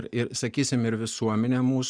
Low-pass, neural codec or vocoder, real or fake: 9.9 kHz; none; real